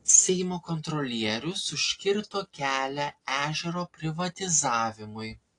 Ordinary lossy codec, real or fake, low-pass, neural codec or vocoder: AAC, 48 kbps; real; 10.8 kHz; none